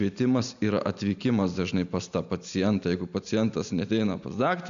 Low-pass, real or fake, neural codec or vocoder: 7.2 kHz; real; none